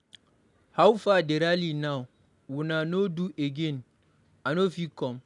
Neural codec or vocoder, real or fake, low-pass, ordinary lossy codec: none; real; 10.8 kHz; none